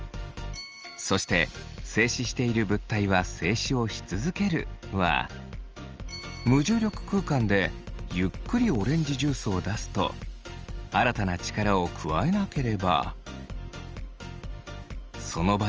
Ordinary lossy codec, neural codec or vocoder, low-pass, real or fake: Opus, 24 kbps; none; 7.2 kHz; real